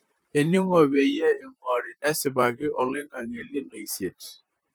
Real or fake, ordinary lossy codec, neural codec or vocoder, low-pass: fake; none; vocoder, 44.1 kHz, 128 mel bands, Pupu-Vocoder; none